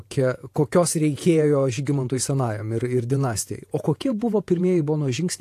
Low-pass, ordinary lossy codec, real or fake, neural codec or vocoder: 14.4 kHz; AAC, 64 kbps; fake; vocoder, 48 kHz, 128 mel bands, Vocos